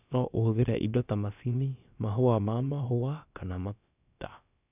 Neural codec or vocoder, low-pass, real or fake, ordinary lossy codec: codec, 16 kHz, about 1 kbps, DyCAST, with the encoder's durations; 3.6 kHz; fake; none